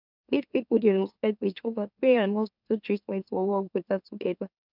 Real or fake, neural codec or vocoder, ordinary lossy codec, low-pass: fake; autoencoder, 44.1 kHz, a latent of 192 numbers a frame, MeloTTS; none; 5.4 kHz